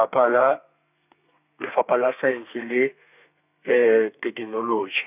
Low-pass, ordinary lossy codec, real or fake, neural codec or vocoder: 3.6 kHz; none; fake; codec, 32 kHz, 1.9 kbps, SNAC